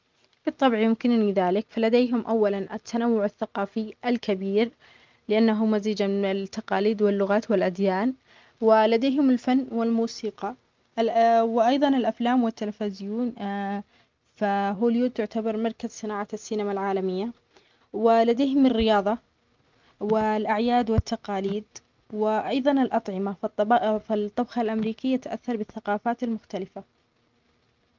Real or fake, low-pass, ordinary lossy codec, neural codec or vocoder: real; 7.2 kHz; Opus, 32 kbps; none